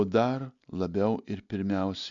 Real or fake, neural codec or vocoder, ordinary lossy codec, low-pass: real; none; MP3, 64 kbps; 7.2 kHz